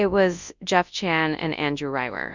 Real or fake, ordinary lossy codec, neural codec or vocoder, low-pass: fake; Opus, 64 kbps; codec, 24 kHz, 0.9 kbps, WavTokenizer, large speech release; 7.2 kHz